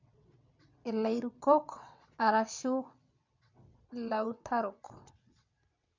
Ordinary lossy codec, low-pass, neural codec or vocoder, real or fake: MP3, 64 kbps; 7.2 kHz; vocoder, 22.05 kHz, 80 mel bands, WaveNeXt; fake